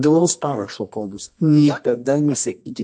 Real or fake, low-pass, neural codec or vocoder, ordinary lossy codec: fake; 10.8 kHz; codec, 24 kHz, 0.9 kbps, WavTokenizer, medium music audio release; MP3, 48 kbps